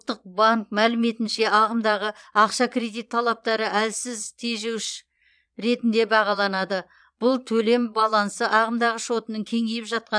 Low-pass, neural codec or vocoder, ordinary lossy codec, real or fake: 9.9 kHz; vocoder, 22.05 kHz, 80 mel bands, Vocos; none; fake